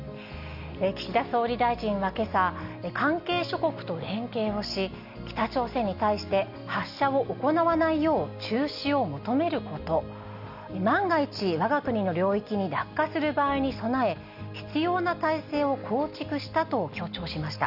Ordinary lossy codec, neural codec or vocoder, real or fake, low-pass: none; none; real; 5.4 kHz